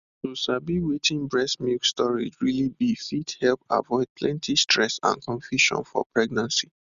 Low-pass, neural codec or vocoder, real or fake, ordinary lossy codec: 7.2 kHz; none; real; Opus, 64 kbps